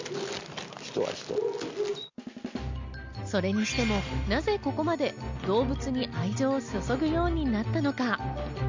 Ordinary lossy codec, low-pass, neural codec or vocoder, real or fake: MP3, 64 kbps; 7.2 kHz; none; real